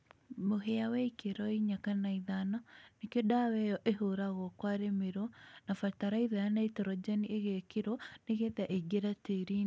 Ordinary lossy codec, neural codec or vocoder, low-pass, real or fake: none; none; none; real